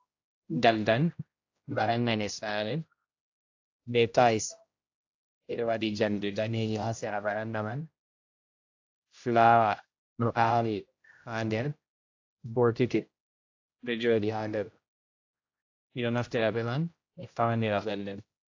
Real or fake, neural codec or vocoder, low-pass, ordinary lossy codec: fake; codec, 16 kHz, 0.5 kbps, X-Codec, HuBERT features, trained on general audio; 7.2 kHz; AAC, 48 kbps